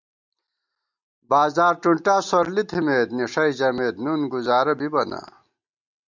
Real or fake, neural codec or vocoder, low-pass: real; none; 7.2 kHz